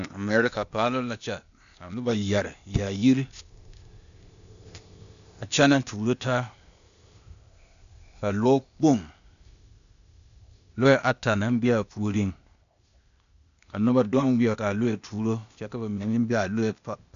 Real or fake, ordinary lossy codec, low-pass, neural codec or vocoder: fake; MP3, 96 kbps; 7.2 kHz; codec, 16 kHz, 0.8 kbps, ZipCodec